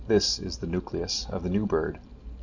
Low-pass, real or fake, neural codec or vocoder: 7.2 kHz; real; none